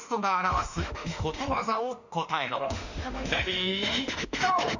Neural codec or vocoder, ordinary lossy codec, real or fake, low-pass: autoencoder, 48 kHz, 32 numbers a frame, DAC-VAE, trained on Japanese speech; none; fake; 7.2 kHz